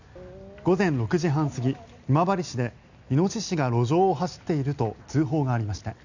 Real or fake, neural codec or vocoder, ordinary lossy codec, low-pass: real; none; none; 7.2 kHz